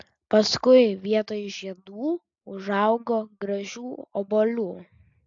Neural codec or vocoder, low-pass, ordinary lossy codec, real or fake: none; 7.2 kHz; AAC, 64 kbps; real